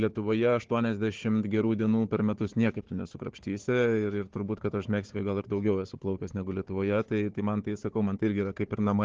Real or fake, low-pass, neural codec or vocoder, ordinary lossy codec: fake; 7.2 kHz; codec, 16 kHz, 4 kbps, FunCodec, trained on Chinese and English, 50 frames a second; Opus, 16 kbps